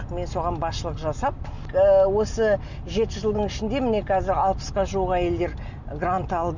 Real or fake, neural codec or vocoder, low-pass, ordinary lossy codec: real; none; 7.2 kHz; none